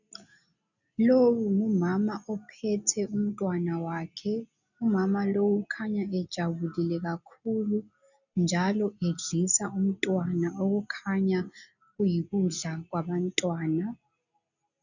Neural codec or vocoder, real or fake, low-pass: none; real; 7.2 kHz